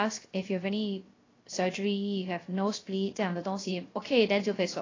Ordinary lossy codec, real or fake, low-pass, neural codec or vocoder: AAC, 32 kbps; fake; 7.2 kHz; codec, 16 kHz, 0.3 kbps, FocalCodec